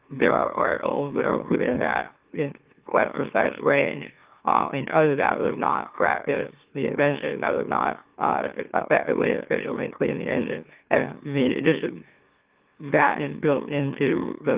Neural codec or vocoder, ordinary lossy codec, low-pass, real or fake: autoencoder, 44.1 kHz, a latent of 192 numbers a frame, MeloTTS; Opus, 32 kbps; 3.6 kHz; fake